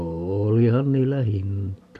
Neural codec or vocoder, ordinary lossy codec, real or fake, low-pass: none; none; real; 14.4 kHz